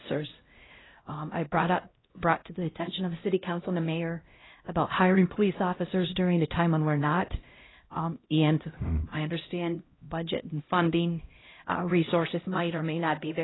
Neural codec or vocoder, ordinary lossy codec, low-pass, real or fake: codec, 16 kHz, 0.5 kbps, X-Codec, HuBERT features, trained on LibriSpeech; AAC, 16 kbps; 7.2 kHz; fake